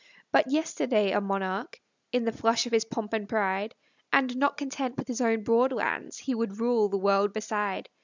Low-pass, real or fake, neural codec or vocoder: 7.2 kHz; real; none